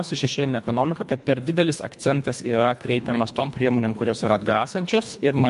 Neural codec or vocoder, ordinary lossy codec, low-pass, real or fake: codec, 24 kHz, 1.5 kbps, HILCodec; MP3, 64 kbps; 10.8 kHz; fake